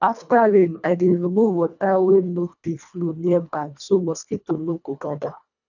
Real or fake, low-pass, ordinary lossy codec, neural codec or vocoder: fake; 7.2 kHz; none; codec, 24 kHz, 1.5 kbps, HILCodec